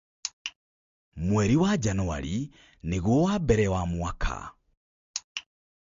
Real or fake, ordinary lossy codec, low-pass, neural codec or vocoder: real; MP3, 48 kbps; 7.2 kHz; none